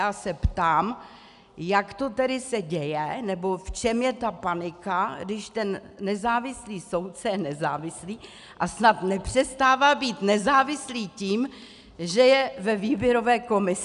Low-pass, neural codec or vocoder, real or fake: 10.8 kHz; none; real